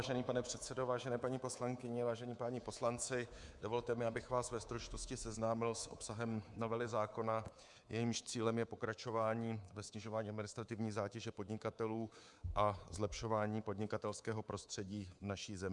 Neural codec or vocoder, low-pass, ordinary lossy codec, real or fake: codec, 24 kHz, 3.1 kbps, DualCodec; 10.8 kHz; Opus, 64 kbps; fake